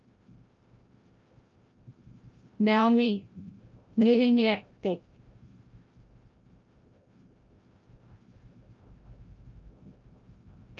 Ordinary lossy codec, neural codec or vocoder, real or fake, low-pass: Opus, 24 kbps; codec, 16 kHz, 0.5 kbps, FreqCodec, larger model; fake; 7.2 kHz